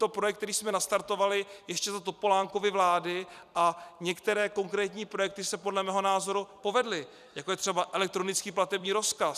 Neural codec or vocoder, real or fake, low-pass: vocoder, 44.1 kHz, 128 mel bands every 256 samples, BigVGAN v2; fake; 14.4 kHz